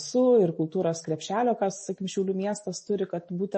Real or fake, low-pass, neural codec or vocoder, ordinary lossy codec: real; 10.8 kHz; none; MP3, 32 kbps